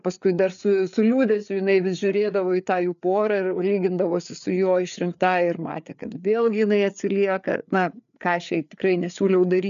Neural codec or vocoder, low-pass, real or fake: codec, 16 kHz, 4 kbps, FreqCodec, larger model; 7.2 kHz; fake